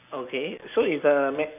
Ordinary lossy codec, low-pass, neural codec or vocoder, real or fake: none; 3.6 kHz; none; real